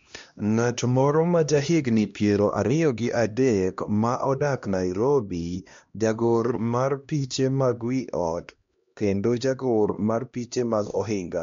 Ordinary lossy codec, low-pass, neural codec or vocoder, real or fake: MP3, 48 kbps; 7.2 kHz; codec, 16 kHz, 1 kbps, X-Codec, HuBERT features, trained on LibriSpeech; fake